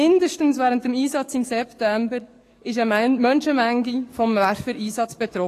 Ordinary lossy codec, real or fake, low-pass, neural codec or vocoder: AAC, 48 kbps; fake; 14.4 kHz; codec, 44.1 kHz, 7.8 kbps, DAC